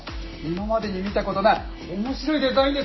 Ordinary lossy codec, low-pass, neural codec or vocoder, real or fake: MP3, 24 kbps; 7.2 kHz; none; real